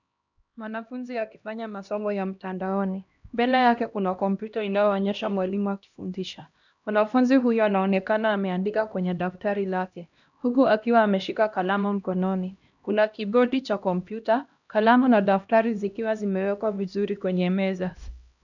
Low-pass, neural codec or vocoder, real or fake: 7.2 kHz; codec, 16 kHz, 1 kbps, X-Codec, HuBERT features, trained on LibriSpeech; fake